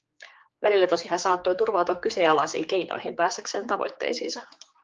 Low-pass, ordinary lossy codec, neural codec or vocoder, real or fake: 7.2 kHz; Opus, 24 kbps; codec, 16 kHz, 4 kbps, X-Codec, HuBERT features, trained on general audio; fake